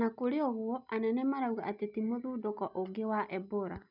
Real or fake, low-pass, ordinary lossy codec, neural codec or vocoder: real; 5.4 kHz; none; none